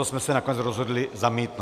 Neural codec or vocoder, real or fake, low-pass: none; real; 14.4 kHz